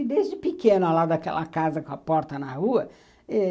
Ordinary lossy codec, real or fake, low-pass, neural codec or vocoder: none; real; none; none